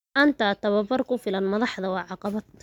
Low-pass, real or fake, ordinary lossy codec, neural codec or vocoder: 19.8 kHz; real; none; none